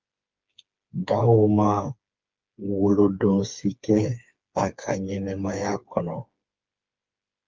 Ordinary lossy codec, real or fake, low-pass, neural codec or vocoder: Opus, 24 kbps; fake; 7.2 kHz; codec, 16 kHz, 4 kbps, FreqCodec, smaller model